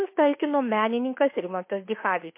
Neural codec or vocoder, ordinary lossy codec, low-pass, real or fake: autoencoder, 48 kHz, 32 numbers a frame, DAC-VAE, trained on Japanese speech; MP3, 24 kbps; 3.6 kHz; fake